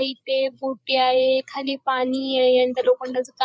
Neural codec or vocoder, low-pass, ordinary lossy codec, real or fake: codec, 16 kHz, 8 kbps, FreqCodec, larger model; none; none; fake